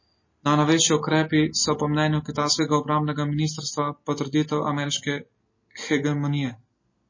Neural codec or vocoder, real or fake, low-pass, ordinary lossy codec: none; real; 7.2 kHz; MP3, 32 kbps